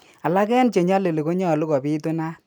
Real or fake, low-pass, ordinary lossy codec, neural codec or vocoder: real; none; none; none